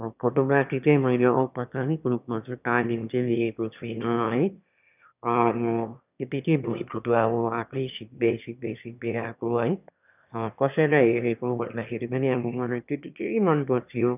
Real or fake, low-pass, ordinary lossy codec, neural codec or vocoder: fake; 3.6 kHz; none; autoencoder, 22.05 kHz, a latent of 192 numbers a frame, VITS, trained on one speaker